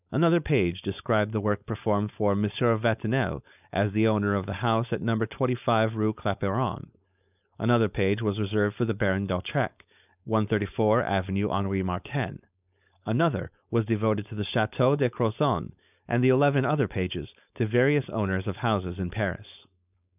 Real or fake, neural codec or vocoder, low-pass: fake; codec, 16 kHz, 4.8 kbps, FACodec; 3.6 kHz